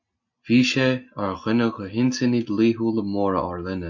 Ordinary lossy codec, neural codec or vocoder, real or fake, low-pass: MP3, 48 kbps; none; real; 7.2 kHz